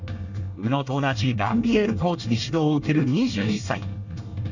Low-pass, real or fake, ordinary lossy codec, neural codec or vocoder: 7.2 kHz; fake; none; codec, 24 kHz, 1 kbps, SNAC